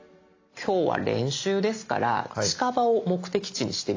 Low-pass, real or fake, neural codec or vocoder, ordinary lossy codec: 7.2 kHz; real; none; AAC, 48 kbps